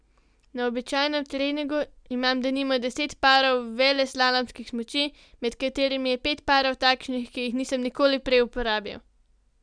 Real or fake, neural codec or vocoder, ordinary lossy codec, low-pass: real; none; none; 9.9 kHz